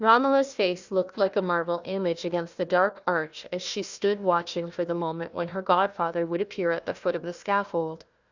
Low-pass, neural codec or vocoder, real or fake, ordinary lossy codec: 7.2 kHz; codec, 16 kHz, 1 kbps, FunCodec, trained on Chinese and English, 50 frames a second; fake; Opus, 64 kbps